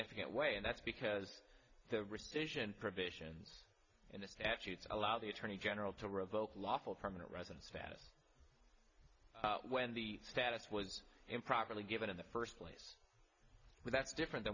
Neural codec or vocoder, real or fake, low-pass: none; real; 7.2 kHz